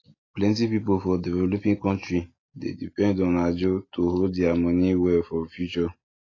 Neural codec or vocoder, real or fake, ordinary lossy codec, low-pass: none; real; AAC, 32 kbps; 7.2 kHz